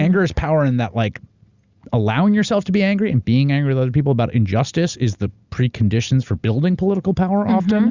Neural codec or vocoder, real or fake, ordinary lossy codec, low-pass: none; real; Opus, 64 kbps; 7.2 kHz